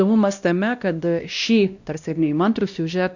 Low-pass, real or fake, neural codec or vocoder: 7.2 kHz; fake; codec, 16 kHz, 1 kbps, X-Codec, WavLM features, trained on Multilingual LibriSpeech